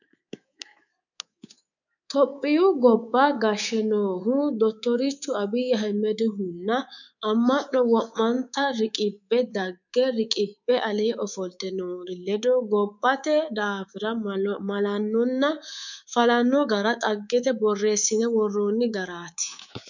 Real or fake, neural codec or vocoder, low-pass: fake; codec, 24 kHz, 3.1 kbps, DualCodec; 7.2 kHz